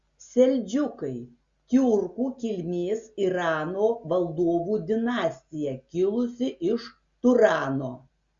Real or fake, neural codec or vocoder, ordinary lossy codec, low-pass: real; none; Opus, 64 kbps; 7.2 kHz